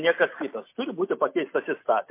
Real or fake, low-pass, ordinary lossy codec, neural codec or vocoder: real; 3.6 kHz; MP3, 24 kbps; none